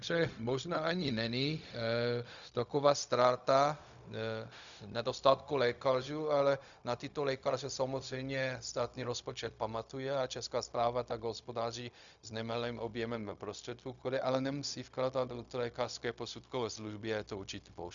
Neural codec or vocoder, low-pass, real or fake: codec, 16 kHz, 0.4 kbps, LongCat-Audio-Codec; 7.2 kHz; fake